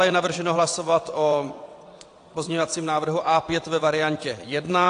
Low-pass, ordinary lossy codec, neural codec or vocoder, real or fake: 9.9 kHz; AAC, 48 kbps; vocoder, 22.05 kHz, 80 mel bands, WaveNeXt; fake